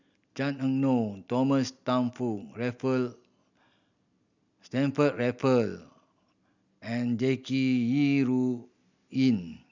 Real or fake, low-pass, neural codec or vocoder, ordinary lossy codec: real; 7.2 kHz; none; none